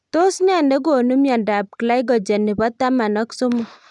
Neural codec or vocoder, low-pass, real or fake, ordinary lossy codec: none; 10.8 kHz; real; none